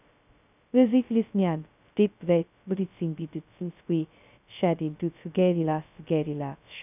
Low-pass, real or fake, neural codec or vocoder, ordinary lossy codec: 3.6 kHz; fake; codec, 16 kHz, 0.2 kbps, FocalCodec; none